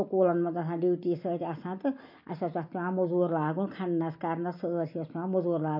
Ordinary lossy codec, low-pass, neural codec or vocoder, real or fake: MP3, 32 kbps; 5.4 kHz; none; real